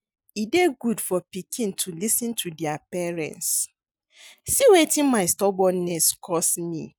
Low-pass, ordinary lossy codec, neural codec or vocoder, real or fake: none; none; none; real